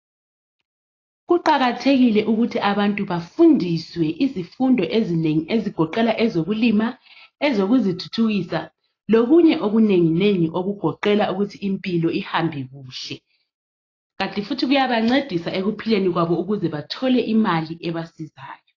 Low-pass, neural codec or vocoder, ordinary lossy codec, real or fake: 7.2 kHz; none; AAC, 32 kbps; real